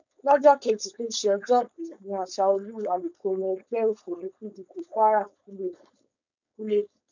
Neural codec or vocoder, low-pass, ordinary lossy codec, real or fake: codec, 16 kHz, 4.8 kbps, FACodec; 7.2 kHz; none; fake